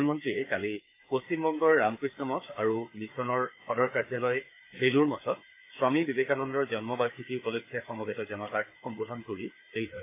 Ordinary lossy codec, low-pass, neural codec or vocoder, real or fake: AAC, 24 kbps; 3.6 kHz; codec, 16 kHz, 4 kbps, FreqCodec, larger model; fake